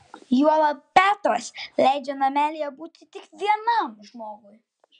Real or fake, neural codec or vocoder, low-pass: real; none; 9.9 kHz